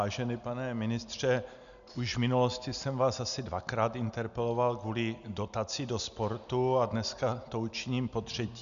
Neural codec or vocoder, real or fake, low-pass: none; real; 7.2 kHz